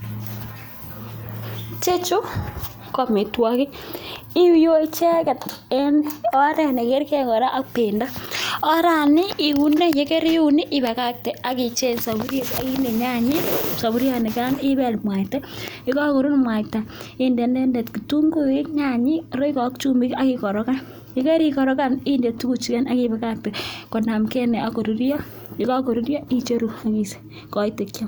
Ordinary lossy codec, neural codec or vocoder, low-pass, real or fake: none; none; none; real